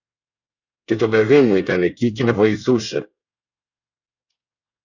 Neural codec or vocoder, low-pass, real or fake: codec, 24 kHz, 1 kbps, SNAC; 7.2 kHz; fake